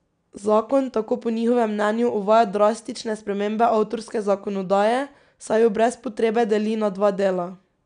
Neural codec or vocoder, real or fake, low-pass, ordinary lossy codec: none; real; 9.9 kHz; none